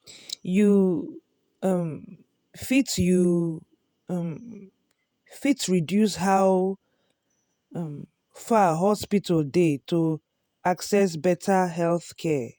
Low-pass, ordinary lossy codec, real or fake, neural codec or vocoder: none; none; fake; vocoder, 48 kHz, 128 mel bands, Vocos